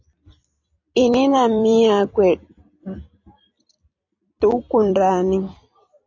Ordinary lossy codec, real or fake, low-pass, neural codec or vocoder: AAC, 48 kbps; fake; 7.2 kHz; vocoder, 44.1 kHz, 128 mel bands every 256 samples, BigVGAN v2